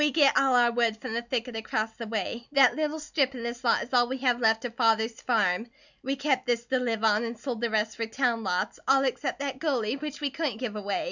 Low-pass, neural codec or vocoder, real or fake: 7.2 kHz; none; real